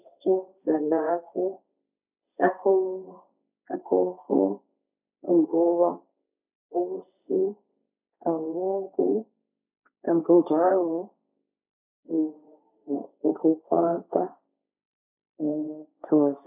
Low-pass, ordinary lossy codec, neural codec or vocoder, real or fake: 3.6 kHz; none; codec, 16 kHz, 1.1 kbps, Voila-Tokenizer; fake